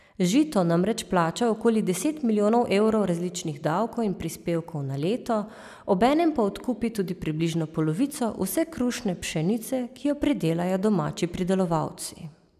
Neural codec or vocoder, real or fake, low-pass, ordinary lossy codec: none; real; 14.4 kHz; none